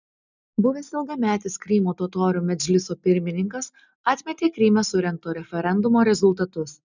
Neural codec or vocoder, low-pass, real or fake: none; 7.2 kHz; real